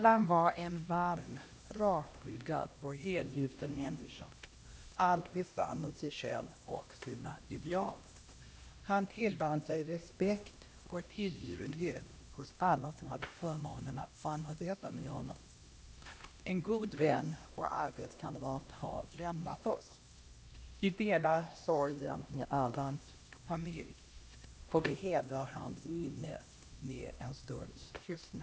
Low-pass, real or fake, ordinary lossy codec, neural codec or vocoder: none; fake; none; codec, 16 kHz, 1 kbps, X-Codec, HuBERT features, trained on LibriSpeech